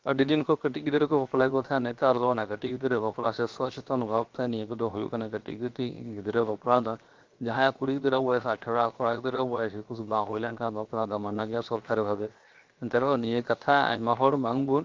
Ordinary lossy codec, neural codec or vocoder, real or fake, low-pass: Opus, 32 kbps; codec, 16 kHz, 0.7 kbps, FocalCodec; fake; 7.2 kHz